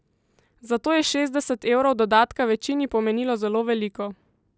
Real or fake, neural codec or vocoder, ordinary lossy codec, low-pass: real; none; none; none